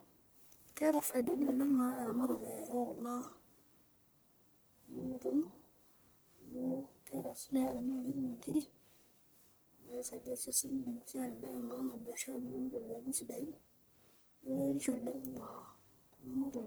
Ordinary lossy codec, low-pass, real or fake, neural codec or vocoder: none; none; fake; codec, 44.1 kHz, 1.7 kbps, Pupu-Codec